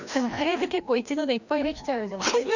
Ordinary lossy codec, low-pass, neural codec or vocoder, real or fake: none; 7.2 kHz; codec, 16 kHz, 1 kbps, FreqCodec, larger model; fake